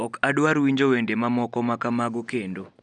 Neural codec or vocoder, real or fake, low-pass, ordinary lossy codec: none; real; 10.8 kHz; none